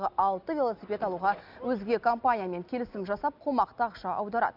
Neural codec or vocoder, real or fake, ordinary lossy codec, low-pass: none; real; none; 5.4 kHz